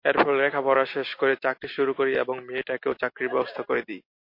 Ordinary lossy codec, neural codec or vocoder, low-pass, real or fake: MP3, 32 kbps; none; 5.4 kHz; real